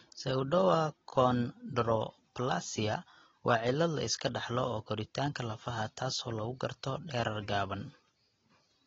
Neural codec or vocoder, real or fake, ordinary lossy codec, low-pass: none; real; AAC, 24 kbps; 7.2 kHz